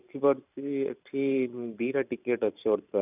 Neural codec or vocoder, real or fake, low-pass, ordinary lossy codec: vocoder, 44.1 kHz, 128 mel bands every 512 samples, BigVGAN v2; fake; 3.6 kHz; none